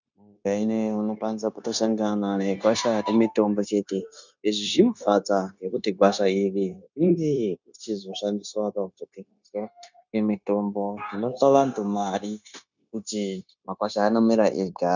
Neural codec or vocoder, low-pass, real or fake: codec, 16 kHz, 0.9 kbps, LongCat-Audio-Codec; 7.2 kHz; fake